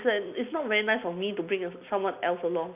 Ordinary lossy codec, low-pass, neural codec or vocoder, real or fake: none; 3.6 kHz; none; real